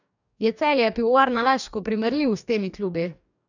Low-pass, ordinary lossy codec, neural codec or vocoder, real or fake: 7.2 kHz; none; codec, 44.1 kHz, 2.6 kbps, DAC; fake